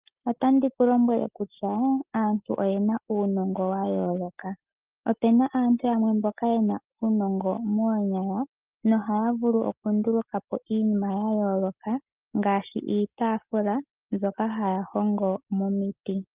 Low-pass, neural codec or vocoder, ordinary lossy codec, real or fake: 3.6 kHz; none; Opus, 32 kbps; real